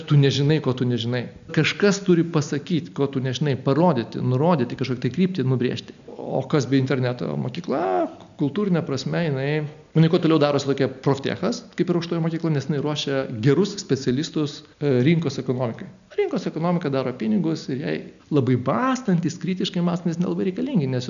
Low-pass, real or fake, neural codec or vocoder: 7.2 kHz; real; none